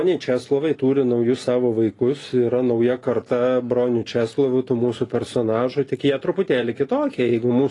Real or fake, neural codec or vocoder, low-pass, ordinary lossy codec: real; none; 10.8 kHz; AAC, 32 kbps